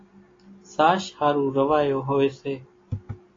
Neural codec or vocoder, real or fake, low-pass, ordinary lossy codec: none; real; 7.2 kHz; AAC, 32 kbps